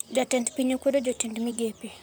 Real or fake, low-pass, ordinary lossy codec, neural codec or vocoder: fake; none; none; vocoder, 44.1 kHz, 128 mel bands, Pupu-Vocoder